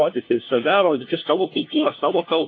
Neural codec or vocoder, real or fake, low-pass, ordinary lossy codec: codec, 16 kHz, 1 kbps, FunCodec, trained on LibriTTS, 50 frames a second; fake; 7.2 kHz; AAC, 32 kbps